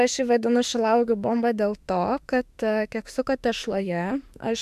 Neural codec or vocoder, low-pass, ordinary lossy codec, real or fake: autoencoder, 48 kHz, 32 numbers a frame, DAC-VAE, trained on Japanese speech; 14.4 kHz; MP3, 96 kbps; fake